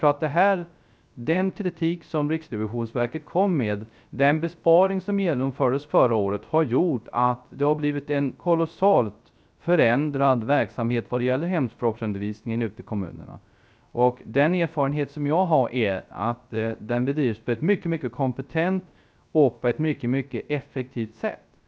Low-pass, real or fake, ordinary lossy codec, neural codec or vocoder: none; fake; none; codec, 16 kHz, 0.3 kbps, FocalCodec